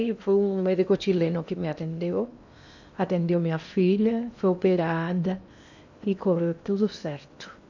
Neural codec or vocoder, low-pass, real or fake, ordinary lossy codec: codec, 16 kHz in and 24 kHz out, 0.8 kbps, FocalCodec, streaming, 65536 codes; 7.2 kHz; fake; none